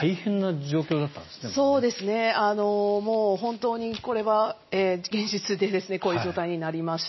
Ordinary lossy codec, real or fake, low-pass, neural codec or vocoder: MP3, 24 kbps; real; 7.2 kHz; none